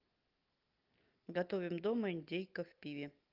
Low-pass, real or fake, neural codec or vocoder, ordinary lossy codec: 5.4 kHz; real; none; Opus, 32 kbps